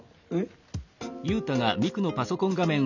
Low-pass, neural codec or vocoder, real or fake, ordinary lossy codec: 7.2 kHz; none; real; none